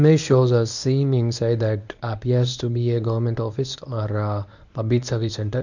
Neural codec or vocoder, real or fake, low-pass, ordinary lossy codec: codec, 24 kHz, 0.9 kbps, WavTokenizer, medium speech release version 1; fake; 7.2 kHz; none